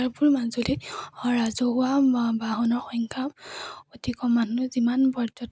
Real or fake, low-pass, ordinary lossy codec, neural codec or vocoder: real; none; none; none